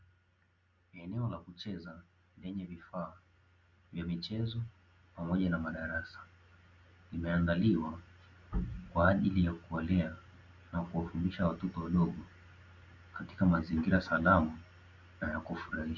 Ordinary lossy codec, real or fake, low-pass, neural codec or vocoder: Opus, 64 kbps; real; 7.2 kHz; none